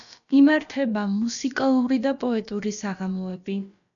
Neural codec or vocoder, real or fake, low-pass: codec, 16 kHz, about 1 kbps, DyCAST, with the encoder's durations; fake; 7.2 kHz